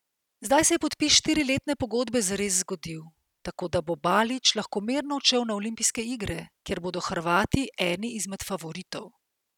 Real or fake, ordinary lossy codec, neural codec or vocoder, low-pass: real; none; none; 19.8 kHz